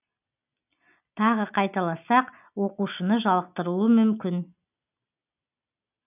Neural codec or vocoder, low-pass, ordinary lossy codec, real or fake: none; 3.6 kHz; none; real